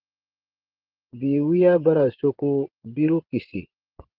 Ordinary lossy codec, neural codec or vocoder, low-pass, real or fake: Opus, 16 kbps; vocoder, 24 kHz, 100 mel bands, Vocos; 5.4 kHz; fake